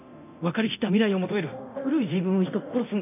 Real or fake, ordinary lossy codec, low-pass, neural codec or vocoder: fake; none; 3.6 kHz; codec, 24 kHz, 0.9 kbps, DualCodec